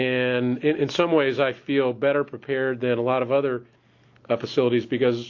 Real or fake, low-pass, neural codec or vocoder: real; 7.2 kHz; none